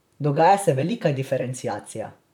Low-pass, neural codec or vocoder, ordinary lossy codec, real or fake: 19.8 kHz; vocoder, 44.1 kHz, 128 mel bands, Pupu-Vocoder; none; fake